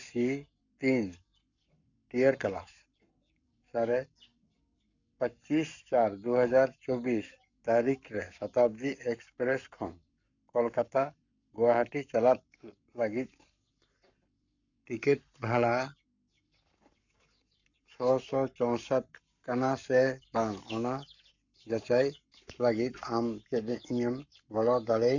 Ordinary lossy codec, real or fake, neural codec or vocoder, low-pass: none; fake; codec, 44.1 kHz, 7.8 kbps, Pupu-Codec; 7.2 kHz